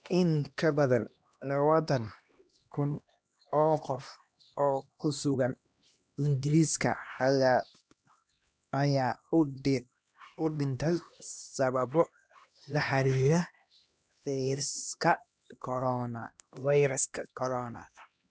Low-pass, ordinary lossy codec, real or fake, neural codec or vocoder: none; none; fake; codec, 16 kHz, 1 kbps, X-Codec, HuBERT features, trained on LibriSpeech